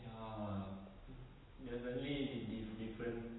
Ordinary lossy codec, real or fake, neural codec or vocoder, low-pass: AAC, 16 kbps; real; none; 7.2 kHz